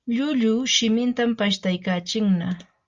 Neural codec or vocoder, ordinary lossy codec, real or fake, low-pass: none; Opus, 32 kbps; real; 7.2 kHz